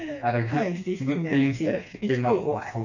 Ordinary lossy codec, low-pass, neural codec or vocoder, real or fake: none; 7.2 kHz; codec, 16 kHz, 2 kbps, FreqCodec, smaller model; fake